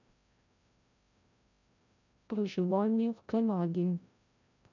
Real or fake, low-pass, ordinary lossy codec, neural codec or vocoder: fake; 7.2 kHz; none; codec, 16 kHz, 0.5 kbps, FreqCodec, larger model